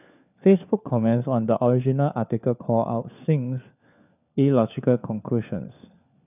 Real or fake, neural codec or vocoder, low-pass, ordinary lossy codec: fake; codec, 16 kHz, 4 kbps, FunCodec, trained on LibriTTS, 50 frames a second; 3.6 kHz; none